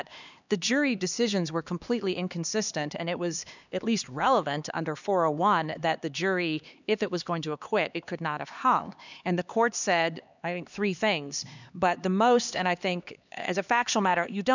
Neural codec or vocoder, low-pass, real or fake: codec, 16 kHz, 2 kbps, X-Codec, HuBERT features, trained on LibriSpeech; 7.2 kHz; fake